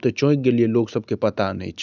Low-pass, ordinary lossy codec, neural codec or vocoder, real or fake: 7.2 kHz; none; none; real